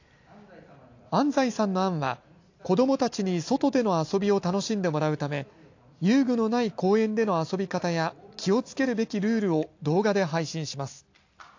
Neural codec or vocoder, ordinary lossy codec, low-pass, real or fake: none; AAC, 48 kbps; 7.2 kHz; real